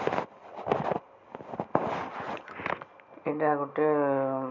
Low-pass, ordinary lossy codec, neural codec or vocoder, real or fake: 7.2 kHz; none; none; real